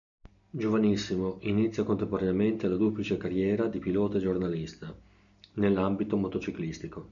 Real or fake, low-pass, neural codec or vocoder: real; 7.2 kHz; none